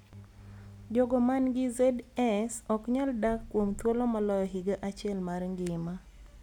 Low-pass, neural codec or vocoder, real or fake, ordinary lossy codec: 19.8 kHz; none; real; none